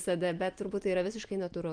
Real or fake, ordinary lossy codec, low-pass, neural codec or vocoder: real; Opus, 24 kbps; 9.9 kHz; none